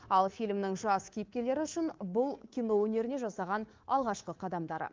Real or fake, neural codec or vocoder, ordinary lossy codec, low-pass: fake; codec, 24 kHz, 3.1 kbps, DualCodec; Opus, 32 kbps; 7.2 kHz